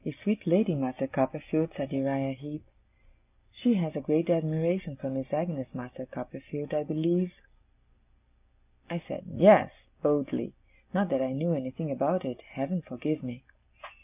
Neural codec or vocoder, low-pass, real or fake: none; 3.6 kHz; real